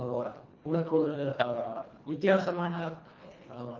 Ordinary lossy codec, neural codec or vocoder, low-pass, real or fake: Opus, 32 kbps; codec, 24 kHz, 1.5 kbps, HILCodec; 7.2 kHz; fake